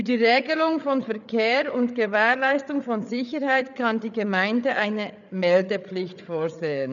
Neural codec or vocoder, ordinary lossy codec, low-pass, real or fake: codec, 16 kHz, 8 kbps, FreqCodec, larger model; none; 7.2 kHz; fake